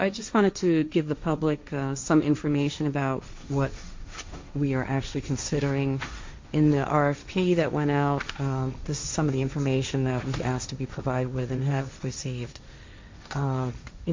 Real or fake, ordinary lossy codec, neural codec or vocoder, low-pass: fake; MP3, 48 kbps; codec, 16 kHz, 1.1 kbps, Voila-Tokenizer; 7.2 kHz